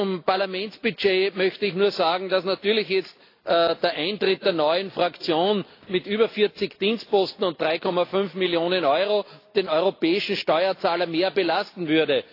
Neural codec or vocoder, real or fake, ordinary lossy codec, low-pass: none; real; AAC, 32 kbps; 5.4 kHz